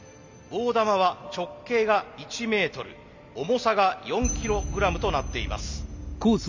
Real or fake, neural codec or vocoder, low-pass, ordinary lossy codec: real; none; 7.2 kHz; MP3, 64 kbps